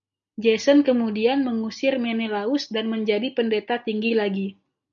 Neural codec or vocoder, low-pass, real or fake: none; 7.2 kHz; real